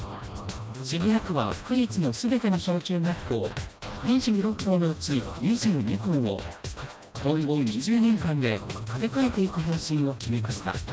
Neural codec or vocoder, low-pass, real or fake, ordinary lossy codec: codec, 16 kHz, 1 kbps, FreqCodec, smaller model; none; fake; none